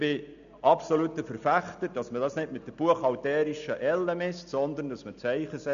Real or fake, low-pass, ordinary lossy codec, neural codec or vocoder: real; 7.2 kHz; none; none